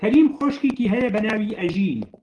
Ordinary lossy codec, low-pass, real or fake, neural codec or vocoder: Opus, 16 kbps; 10.8 kHz; real; none